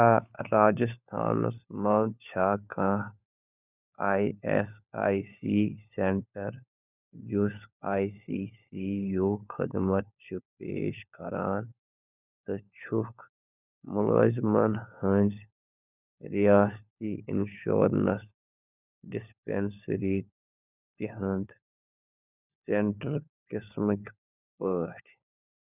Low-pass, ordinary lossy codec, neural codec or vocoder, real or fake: 3.6 kHz; none; codec, 16 kHz, 4 kbps, FunCodec, trained on LibriTTS, 50 frames a second; fake